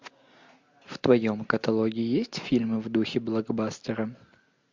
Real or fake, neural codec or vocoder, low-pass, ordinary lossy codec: real; none; 7.2 kHz; MP3, 64 kbps